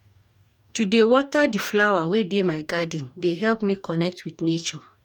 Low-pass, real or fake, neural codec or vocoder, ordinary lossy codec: 19.8 kHz; fake; codec, 44.1 kHz, 2.6 kbps, DAC; none